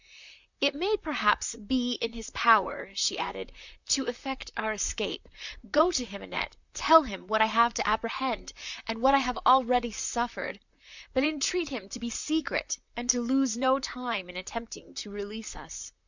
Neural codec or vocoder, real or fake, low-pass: vocoder, 44.1 kHz, 128 mel bands, Pupu-Vocoder; fake; 7.2 kHz